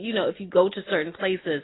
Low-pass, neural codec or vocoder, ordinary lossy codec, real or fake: 7.2 kHz; none; AAC, 16 kbps; real